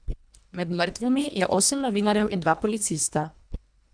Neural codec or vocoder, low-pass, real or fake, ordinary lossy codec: codec, 24 kHz, 1.5 kbps, HILCodec; 9.9 kHz; fake; none